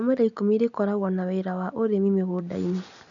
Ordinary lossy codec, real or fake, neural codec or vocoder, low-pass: none; real; none; 7.2 kHz